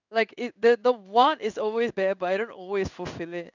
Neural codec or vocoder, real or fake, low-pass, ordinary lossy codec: codec, 16 kHz in and 24 kHz out, 1 kbps, XY-Tokenizer; fake; 7.2 kHz; none